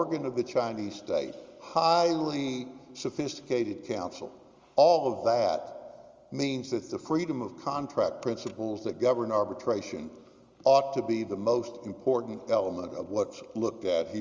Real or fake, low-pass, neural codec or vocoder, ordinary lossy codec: real; 7.2 kHz; none; Opus, 32 kbps